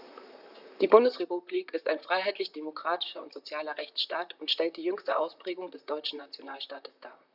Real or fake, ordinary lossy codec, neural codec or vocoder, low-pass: fake; none; vocoder, 44.1 kHz, 128 mel bands, Pupu-Vocoder; 5.4 kHz